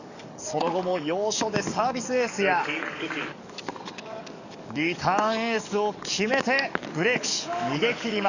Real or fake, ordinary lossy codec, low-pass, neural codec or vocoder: fake; none; 7.2 kHz; codec, 44.1 kHz, 7.8 kbps, DAC